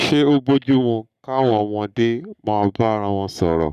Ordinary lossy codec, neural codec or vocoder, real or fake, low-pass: none; codec, 44.1 kHz, 7.8 kbps, Pupu-Codec; fake; 14.4 kHz